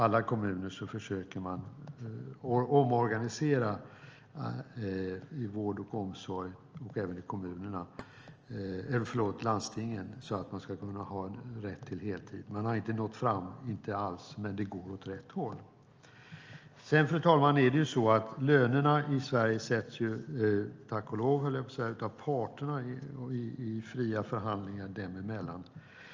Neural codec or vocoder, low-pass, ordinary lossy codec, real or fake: none; 7.2 kHz; Opus, 24 kbps; real